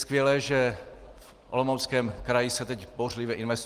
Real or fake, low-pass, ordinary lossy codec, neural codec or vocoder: real; 14.4 kHz; Opus, 24 kbps; none